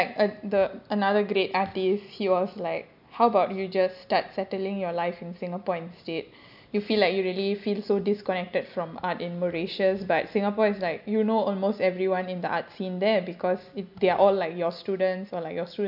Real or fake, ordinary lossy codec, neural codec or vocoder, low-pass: real; none; none; 5.4 kHz